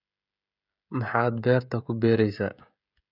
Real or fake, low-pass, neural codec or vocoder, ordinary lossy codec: fake; 5.4 kHz; codec, 16 kHz, 16 kbps, FreqCodec, smaller model; none